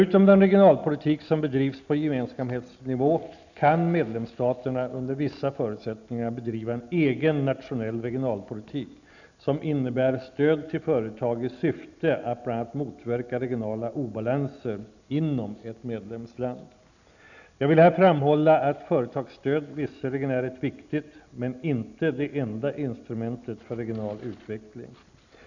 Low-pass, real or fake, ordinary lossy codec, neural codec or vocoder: 7.2 kHz; real; none; none